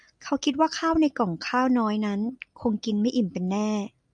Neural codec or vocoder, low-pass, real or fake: none; 9.9 kHz; real